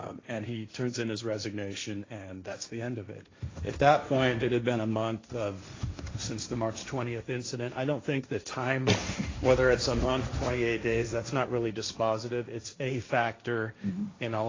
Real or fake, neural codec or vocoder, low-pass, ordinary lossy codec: fake; codec, 16 kHz, 1.1 kbps, Voila-Tokenizer; 7.2 kHz; AAC, 32 kbps